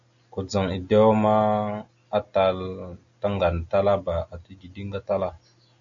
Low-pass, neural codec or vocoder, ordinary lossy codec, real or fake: 7.2 kHz; none; AAC, 48 kbps; real